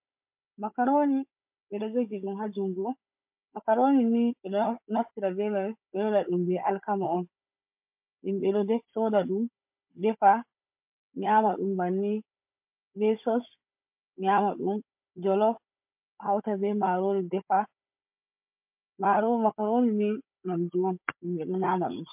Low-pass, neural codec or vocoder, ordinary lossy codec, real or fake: 3.6 kHz; codec, 16 kHz, 16 kbps, FunCodec, trained on Chinese and English, 50 frames a second; MP3, 32 kbps; fake